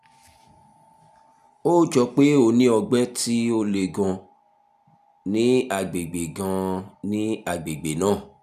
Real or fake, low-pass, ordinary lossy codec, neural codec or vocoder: real; 14.4 kHz; none; none